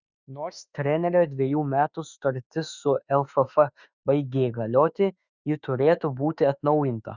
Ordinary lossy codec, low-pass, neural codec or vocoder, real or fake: Opus, 64 kbps; 7.2 kHz; autoencoder, 48 kHz, 32 numbers a frame, DAC-VAE, trained on Japanese speech; fake